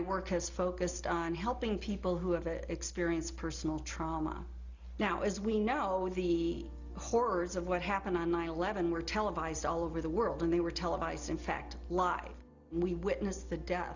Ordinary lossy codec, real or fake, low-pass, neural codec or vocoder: Opus, 64 kbps; real; 7.2 kHz; none